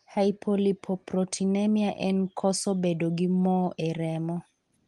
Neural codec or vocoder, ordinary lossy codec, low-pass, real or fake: none; Opus, 24 kbps; 10.8 kHz; real